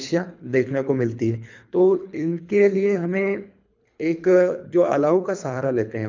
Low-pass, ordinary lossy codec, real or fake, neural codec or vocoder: 7.2 kHz; MP3, 64 kbps; fake; codec, 24 kHz, 3 kbps, HILCodec